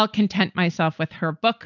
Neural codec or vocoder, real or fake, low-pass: none; real; 7.2 kHz